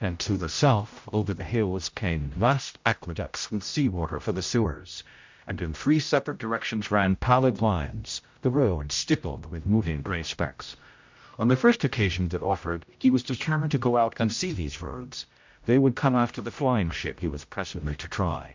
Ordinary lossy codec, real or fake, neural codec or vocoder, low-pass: MP3, 64 kbps; fake; codec, 16 kHz, 0.5 kbps, X-Codec, HuBERT features, trained on general audio; 7.2 kHz